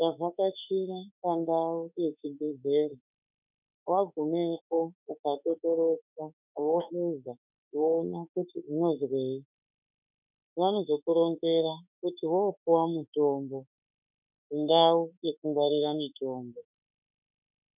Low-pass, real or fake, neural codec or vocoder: 3.6 kHz; fake; autoencoder, 48 kHz, 32 numbers a frame, DAC-VAE, trained on Japanese speech